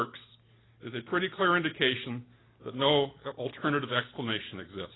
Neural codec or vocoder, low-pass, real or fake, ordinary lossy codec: none; 7.2 kHz; real; AAC, 16 kbps